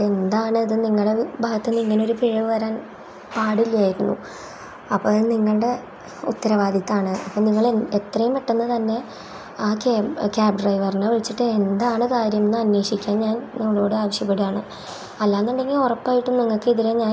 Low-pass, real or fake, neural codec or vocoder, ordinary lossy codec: none; real; none; none